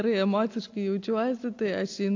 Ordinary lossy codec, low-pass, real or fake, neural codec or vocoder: AAC, 48 kbps; 7.2 kHz; real; none